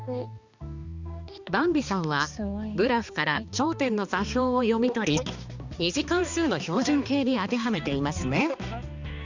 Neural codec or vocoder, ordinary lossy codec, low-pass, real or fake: codec, 16 kHz, 2 kbps, X-Codec, HuBERT features, trained on balanced general audio; Opus, 64 kbps; 7.2 kHz; fake